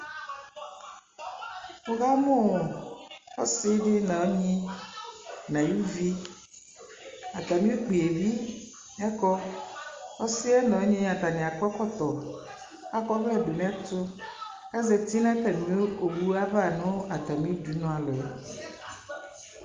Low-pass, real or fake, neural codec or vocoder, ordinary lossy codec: 7.2 kHz; real; none; Opus, 32 kbps